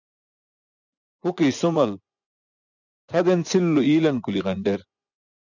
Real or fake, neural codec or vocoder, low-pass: fake; vocoder, 24 kHz, 100 mel bands, Vocos; 7.2 kHz